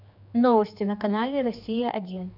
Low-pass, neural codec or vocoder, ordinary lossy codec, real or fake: 5.4 kHz; codec, 16 kHz, 2 kbps, X-Codec, HuBERT features, trained on general audio; none; fake